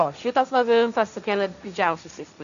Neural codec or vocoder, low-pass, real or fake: codec, 16 kHz, 1.1 kbps, Voila-Tokenizer; 7.2 kHz; fake